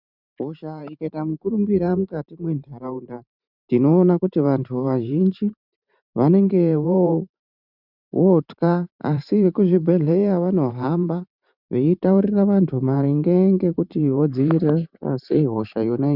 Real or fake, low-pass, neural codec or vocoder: fake; 5.4 kHz; vocoder, 44.1 kHz, 128 mel bands every 512 samples, BigVGAN v2